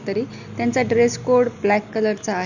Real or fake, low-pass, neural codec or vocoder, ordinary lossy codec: real; 7.2 kHz; none; none